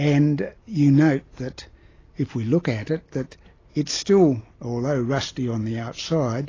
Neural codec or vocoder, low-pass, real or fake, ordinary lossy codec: none; 7.2 kHz; real; AAC, 32 kbps